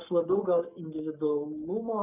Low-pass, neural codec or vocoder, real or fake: 3.6 kHz; codec, 44.1 kHz, 7.8 kbps, Pupu-Codec; fake